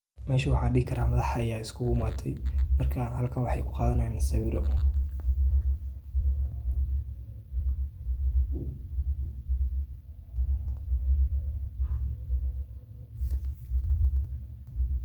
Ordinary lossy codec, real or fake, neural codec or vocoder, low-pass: Opus, 32 kbps; fake; vocoder, 44.1 kHz, 128 mel bands every 256 samples, BigVGAN v2; 19.8 kHz